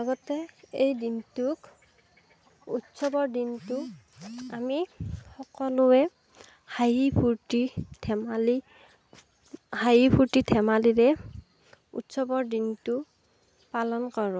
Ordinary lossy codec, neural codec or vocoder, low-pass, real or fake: none; none; none; real